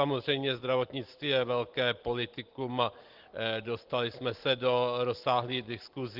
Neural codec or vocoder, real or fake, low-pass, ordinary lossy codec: none; real; 5.4 kHz; Opus, 16 kbps